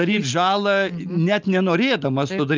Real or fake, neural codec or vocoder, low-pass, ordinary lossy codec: real; none; 7.2 kHz; Opus, 32 kbps